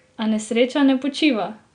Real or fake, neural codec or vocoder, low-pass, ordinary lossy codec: real; none; 9.9 kHz; Opus, 64 kbps